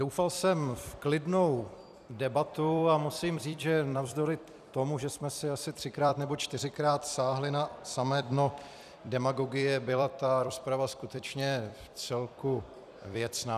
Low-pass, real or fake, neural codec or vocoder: 14.4 kHz; fake; vocoder, 44.1 kHz, 128 mel bands every 256 samples, BigVGAN v2